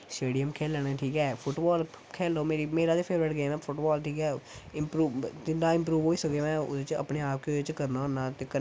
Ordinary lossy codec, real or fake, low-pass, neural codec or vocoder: none; real; none; none